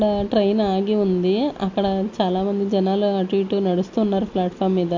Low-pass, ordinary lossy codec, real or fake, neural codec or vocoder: 7.2 kHz; MP3, 48 kbps; real; none